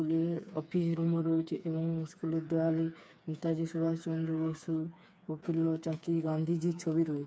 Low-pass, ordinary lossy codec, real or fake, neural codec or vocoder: none; none; fake; codec, 16 kHz, 4 kbps, FreqCodec, smaller model